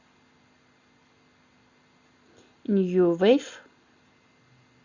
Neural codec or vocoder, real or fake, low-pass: none; real; 7.2 kHz